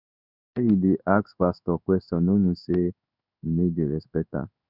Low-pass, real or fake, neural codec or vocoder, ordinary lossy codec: 5.4 kHz; fake; codec, 16 kHz in and 24 kHz out, 1 kbps, XY-Tokenizer; none